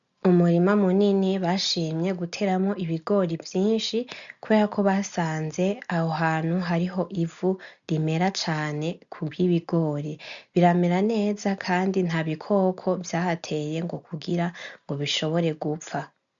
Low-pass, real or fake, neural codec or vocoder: 7.2 kHz; real; none